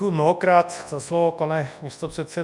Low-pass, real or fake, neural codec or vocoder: 10.8 kHz; fake; codec, 24 kHz, 0.9 kbps, WavTokenizer, large speech release